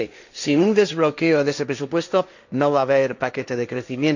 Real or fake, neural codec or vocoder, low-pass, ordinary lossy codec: fake; codec, 16 kHz, 1.1 kbps, Voila-Tokenizer; 7.2 kHz; none